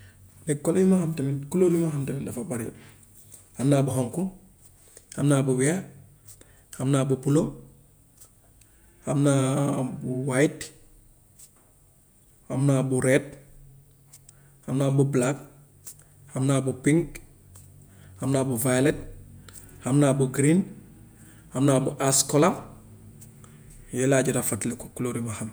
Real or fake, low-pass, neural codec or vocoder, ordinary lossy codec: fake; none; vocoder, 48 kHz, 128 mel bands, Vocos; none